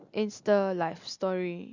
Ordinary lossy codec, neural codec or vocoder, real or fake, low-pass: Opus, 64 kbps; none; real; 7.2 kHz